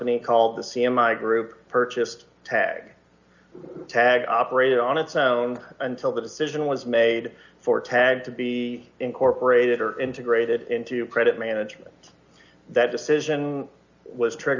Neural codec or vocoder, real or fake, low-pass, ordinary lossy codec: none; real; 7.2 kHz; Opus, 64 kbps